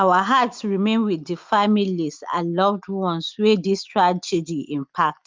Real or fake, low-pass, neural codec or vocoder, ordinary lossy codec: real; 7.2 kHz; none; Opus, 24 kbps